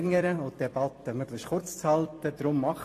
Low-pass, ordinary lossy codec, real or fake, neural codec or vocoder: 14.4 kHz; AAC, 48 kbps; fake; vocoder, 44.1 kHz, 128 mel bands every 256 samples, BigVGAN v2